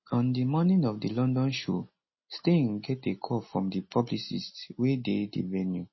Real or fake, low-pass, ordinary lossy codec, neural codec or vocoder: real; 7.2 kHz; MP3, 24 kbps; none